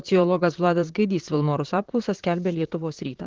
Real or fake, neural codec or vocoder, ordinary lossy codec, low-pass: fake; vocoder, 44.1 kHz, 128 mel bands, Pupu-Vocoder; Opus, 16 kbps; 7.2 kHz